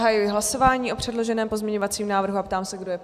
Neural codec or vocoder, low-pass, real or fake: none; 14.4 kHz; real